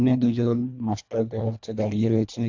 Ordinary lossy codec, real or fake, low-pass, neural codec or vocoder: AAC, 48 kbps; fake; 7.2 kHz; codec, 24 kHz, 1.5 kbps, HILCodec